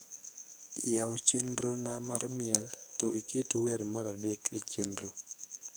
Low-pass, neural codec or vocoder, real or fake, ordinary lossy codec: none; codec, 44.1 kHz, 2.6 kbps, SNAC; fake; none